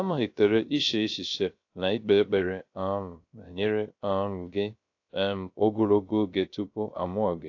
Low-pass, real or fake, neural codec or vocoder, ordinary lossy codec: 7.2 kHz; fake; codec, 16 kHz, 0.3 kbps, FocalCodec; AAC, 48 kbps